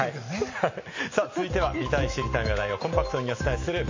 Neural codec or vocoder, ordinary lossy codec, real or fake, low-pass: none; MP3, 32 kbps; real; 7.2 kHz